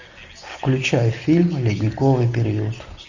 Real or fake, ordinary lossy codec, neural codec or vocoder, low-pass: real; Opus, 64 kbps; none; 7.2 kHz